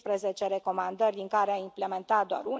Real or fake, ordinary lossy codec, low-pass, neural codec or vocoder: real; none; none; none